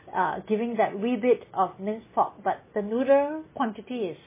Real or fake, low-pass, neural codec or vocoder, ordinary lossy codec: real; 3.6 kHz; none; MP3, 16 kbps